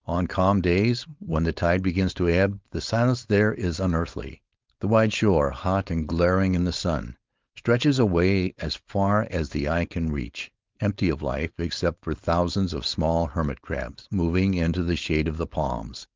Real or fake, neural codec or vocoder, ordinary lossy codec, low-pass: fake; codec, 16 kHz, 4.8 kbps, FACodec; Opus, 32 kbps; 7.2 kHz